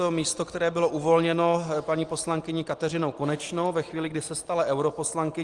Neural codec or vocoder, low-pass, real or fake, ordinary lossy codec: none; 10.8 kHz; real; Opus, 24 kbps